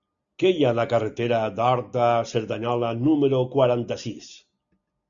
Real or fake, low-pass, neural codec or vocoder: real; 7.2 kHz; none